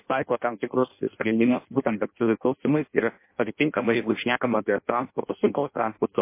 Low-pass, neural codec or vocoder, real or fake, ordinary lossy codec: 3.6 kHz; codec, 16 kHz in and 24 kHz out, 0.6 kbps, FireRedTTS-2 codec; fake; MP3, 24 kbps